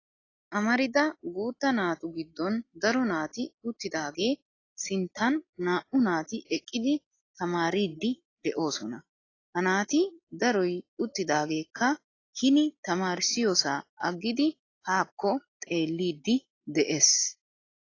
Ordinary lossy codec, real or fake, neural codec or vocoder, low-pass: AAC, 32 kbps; real; none; 7.2 kHz